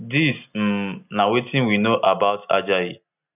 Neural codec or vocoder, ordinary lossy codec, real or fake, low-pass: none; none; real; 3.6 kHz